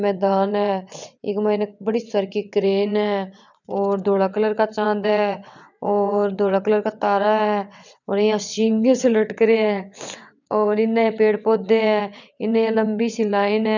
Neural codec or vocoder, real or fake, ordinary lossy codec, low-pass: vocoder, 22.05 kHz, 80 mel bands, WaveNeXt; fake; none; 7.2 kHz